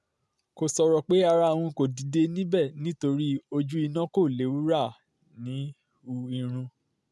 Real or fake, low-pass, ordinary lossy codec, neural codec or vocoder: real; none; none; none